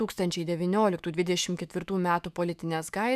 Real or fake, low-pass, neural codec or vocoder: fake; 14.4 kHz; autoencoder, 48 kHz, 128 numbers a frame, DAC-VAE, trained on Japanese speech